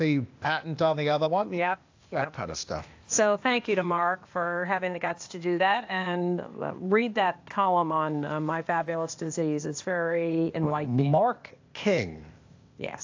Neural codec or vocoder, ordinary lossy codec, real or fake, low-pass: codec, 16 kHz, 0.8 kbps, ZipCodec; AAC, 48 kbps; fake; 7.2 kHz